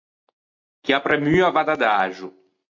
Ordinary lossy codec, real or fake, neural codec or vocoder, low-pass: MP3, 48 kbps; real; none; 7.2 kHz